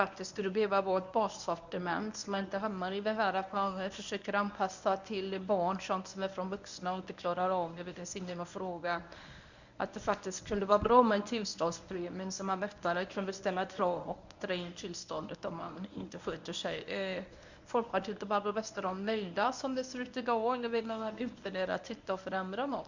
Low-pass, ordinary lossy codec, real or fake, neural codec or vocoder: 7.2 kHz; none; fake; codec, 24 kHz, 0.9 kbps, WavTokenizer, medium speech release version 1